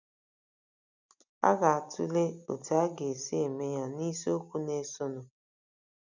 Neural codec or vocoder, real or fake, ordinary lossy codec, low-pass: none; real; none; 7.2 kHz